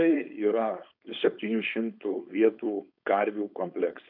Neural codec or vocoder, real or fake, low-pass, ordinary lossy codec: codec, 16 kHz, 4.8 kbps, FACodec; fake; 5.4 kHz; AAC, 48 kbps